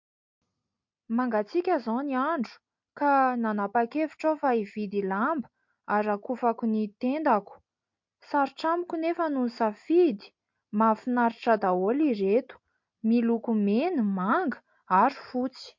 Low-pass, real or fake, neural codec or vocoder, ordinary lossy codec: 7.2 kHz; real; none; MP3, 48 kbps